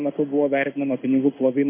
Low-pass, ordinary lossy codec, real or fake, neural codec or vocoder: 3.6 kHz; MP3, 24 kbps; fake; codec, 16 kHz in and 24 kHz out, 1 kbps, XY-Tokenizer